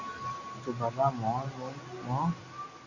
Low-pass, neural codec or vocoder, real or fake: 7.2 kHz; none; real